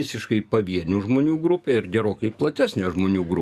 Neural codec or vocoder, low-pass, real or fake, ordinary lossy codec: codec, 44.1 kHz, 7.8 kbps, DAC; 14.4 kHz; fake; Opus, 64 kbps